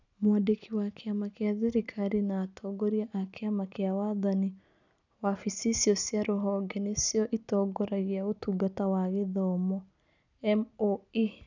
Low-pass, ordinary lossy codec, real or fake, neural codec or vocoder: 7.2 kHz; none; real; none